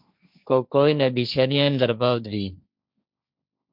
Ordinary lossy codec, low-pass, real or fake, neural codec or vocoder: MP3, 48 kbps; 5.4 kHz; fake; codec, 16 kHz, 1.1 kbps, Voila-Tokenizer